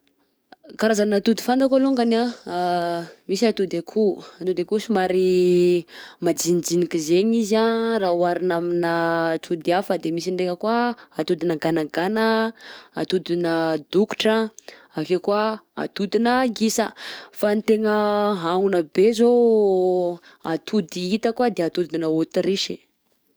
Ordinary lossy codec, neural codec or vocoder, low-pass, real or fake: none; codec, 44.1 kHz, 7.8 kbps, DAC; none; fake